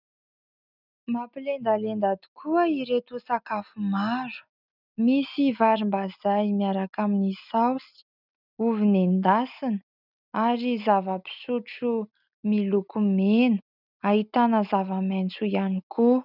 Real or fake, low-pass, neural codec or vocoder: real; 5.4 kHz; none